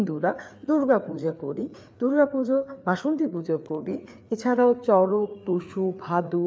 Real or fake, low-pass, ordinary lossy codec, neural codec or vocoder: fake; none; none; codec, 16 kHz, 4 kbps, FreqCodec, larger model